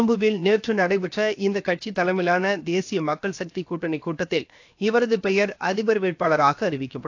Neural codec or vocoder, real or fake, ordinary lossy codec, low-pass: codec, 16 kHz, 0.7 kbps, FocalCodec; fake; AAC, 48 kbps; 7.2 kHz